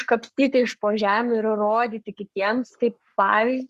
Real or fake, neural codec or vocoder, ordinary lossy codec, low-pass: fake; codec, 44.1 kHz, 7.8 kbps, Pupu-Codec; Opus, 64 kbps; 14.4 kHz